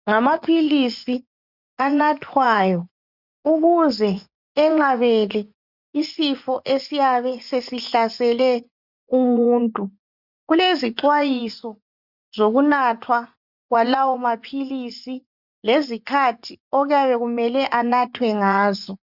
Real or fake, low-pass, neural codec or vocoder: fake; 5.4 kHz; vocoder, 24 kHz, 100 mel bands, Vocos